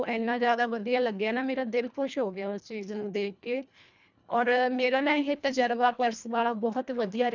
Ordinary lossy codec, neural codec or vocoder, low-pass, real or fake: none; codec, 24 kHz, 1.5 kbps, HILCodec; 7.2 kHz; fake